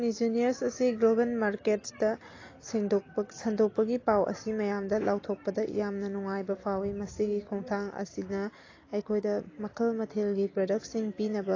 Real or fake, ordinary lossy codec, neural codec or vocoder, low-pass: real; AAC, 32 kbps; none; 7.2 kHz